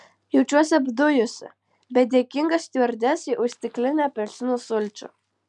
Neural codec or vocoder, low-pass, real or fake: none; 10.8 kHz; real